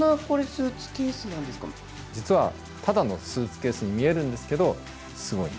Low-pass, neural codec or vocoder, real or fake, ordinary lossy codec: none; none; real; none